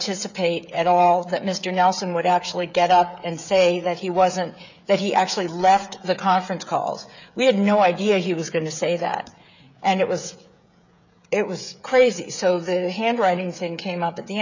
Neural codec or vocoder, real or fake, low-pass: codec, 16 kHz, 16 kbps, FreqCodec, smaller model; fake; 7.2 kHz